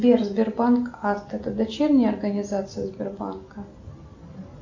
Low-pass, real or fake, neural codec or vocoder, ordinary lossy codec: 7.2 kHz; real; none; AAC, 48 kbps